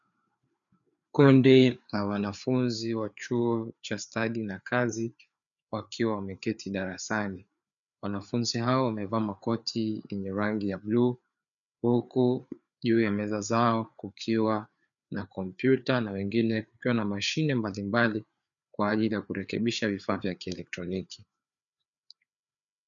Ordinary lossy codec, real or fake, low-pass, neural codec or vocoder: MP3, 96 kbps; fake; 7.2 kHz; codec, 16 kHz, 4 kbps, FreqCodec, larger model